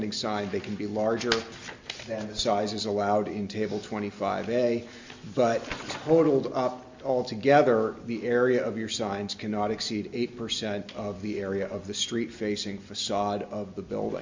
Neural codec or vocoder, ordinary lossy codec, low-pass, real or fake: none; MP3, 64 kbps; 7.2 kHz; real